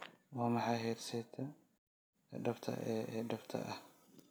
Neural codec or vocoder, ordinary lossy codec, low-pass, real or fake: none; none; none; real